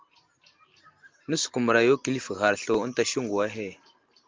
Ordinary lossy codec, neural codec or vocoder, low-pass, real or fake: Opus, 32 kbps; none; 7.2 kHz; real